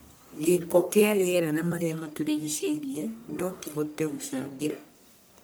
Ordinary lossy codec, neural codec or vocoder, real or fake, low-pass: none; codec, 44.1 kHz, 1.7 kbps, Pupu-Codec; fake; none